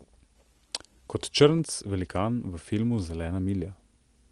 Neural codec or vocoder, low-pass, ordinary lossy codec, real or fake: none; 10.8 kHz; Opus, 24 kbps; real